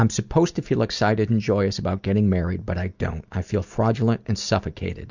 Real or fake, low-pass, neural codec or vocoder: real; 7.2 kHz; none